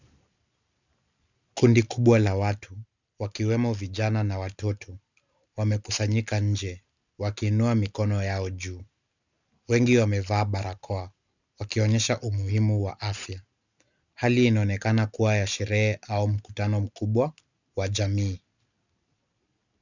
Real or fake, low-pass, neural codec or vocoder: real; 7.2 kHz; none